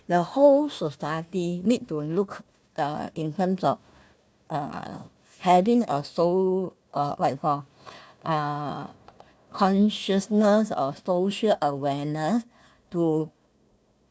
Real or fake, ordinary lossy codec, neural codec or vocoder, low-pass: fake; none; codec, 16 kHz, 1 kbps, FunCodec, trained on Chinese and English, 50 frames a second; none